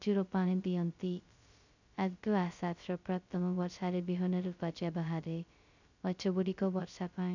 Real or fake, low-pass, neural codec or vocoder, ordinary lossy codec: fake; 7.2 kHz; codec, 16 kHz, 0.2 kbps, FocalCodec; none